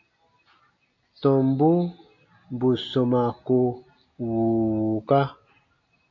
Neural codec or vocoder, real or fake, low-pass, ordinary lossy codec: none; real; 7.2 kHz; MP3, 48 kbps